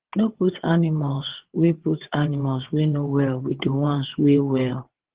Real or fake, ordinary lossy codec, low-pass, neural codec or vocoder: fake; Opus, 16 kbps; 3.6 kHz; vocoder, 22.05 kHz, 80 mel bands, WaveNeXt